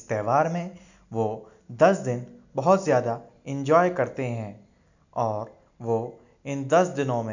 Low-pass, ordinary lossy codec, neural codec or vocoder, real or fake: 7.2 kHz; none; none; real